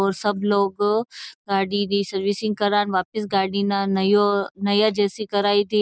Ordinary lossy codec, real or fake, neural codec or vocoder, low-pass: none; real; none; none